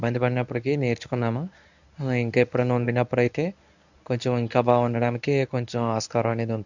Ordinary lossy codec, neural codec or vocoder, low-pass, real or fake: none; codec, 24 kHz, 0.9 kbps, WavTokenizer, medium speech release version 1; 7.2 kHz; fake